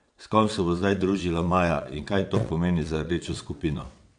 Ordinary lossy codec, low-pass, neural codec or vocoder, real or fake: MP3, 64 kbps; 9.9 kHz; vocoder, 22.05 kHz, 80 mel bands, WaveNeXt; fake